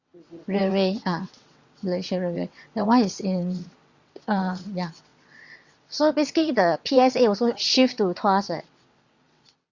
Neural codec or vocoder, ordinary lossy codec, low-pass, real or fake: vocoder, 22.05 kHz, 80 mel bands, WaveNeXt; Opus, 64 kbps; 7.2 kHz; fake